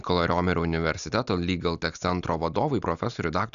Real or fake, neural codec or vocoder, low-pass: real; none; 7.2 kHz